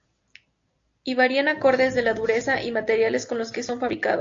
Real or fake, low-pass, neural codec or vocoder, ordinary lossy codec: real; 7.2 kHz; none; AAC, 48 kbps